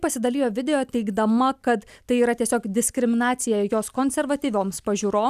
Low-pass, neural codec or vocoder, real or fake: 14.4 kHz; none; real